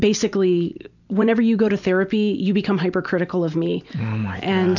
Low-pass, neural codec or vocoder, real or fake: 7.2 kHz; vocoder, 44.1 kHz, 128 mel bands every 256 samples, BigVGAN v2; fake